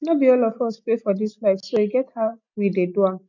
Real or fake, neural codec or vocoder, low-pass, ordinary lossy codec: real; none; 7.2 kHz; none